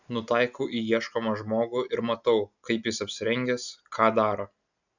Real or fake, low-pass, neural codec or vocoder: real; 7.2 kHz; none